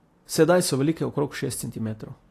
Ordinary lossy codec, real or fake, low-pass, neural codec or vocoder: AAC, 48 kbps; real; 14.4 kHz; none